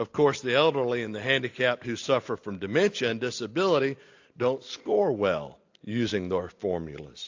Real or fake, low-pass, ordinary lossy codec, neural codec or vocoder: real; 7.2 kHz; AAC, 48 kbps; none